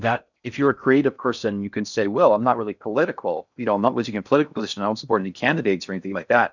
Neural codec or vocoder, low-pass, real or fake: codec, 16 kHz in and 24 kHz out, 0.6 kbps, FocalCodec, streaming, 4096 codes; 7.2 kHz; fake